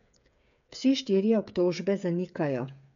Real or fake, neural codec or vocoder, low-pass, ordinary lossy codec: fake; codec, 16 kHz, 8 kbps, FreqCodec, smaller model; 7.2 kHz; none